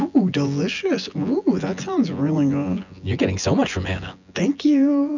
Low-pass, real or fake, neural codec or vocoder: 7.2 kHz; fake; vocoder, 24 kHz, 100 mel bands, Vocos